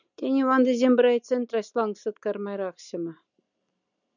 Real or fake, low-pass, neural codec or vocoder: real; 7.2 kHz; none